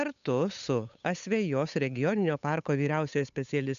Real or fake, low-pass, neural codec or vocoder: fake; 7.2 kHz; codec, 16 kHz, 8 kbps, FunCodec, trained on Chinese and English, 25 frames a second